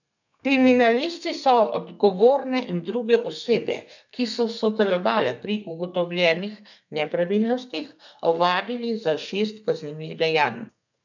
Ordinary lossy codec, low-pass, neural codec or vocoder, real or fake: none; 7.2 kHz; codec, 32 kHz, 1.9 kbps, SNAC; fake